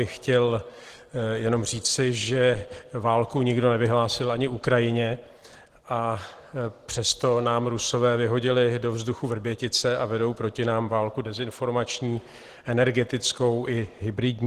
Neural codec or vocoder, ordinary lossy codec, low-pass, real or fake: none; Opus, 16 kbps; 14.4 kHz; real